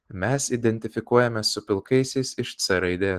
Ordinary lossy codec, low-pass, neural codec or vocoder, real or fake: Opus, 16 kbps; 14.4 kHz; none; real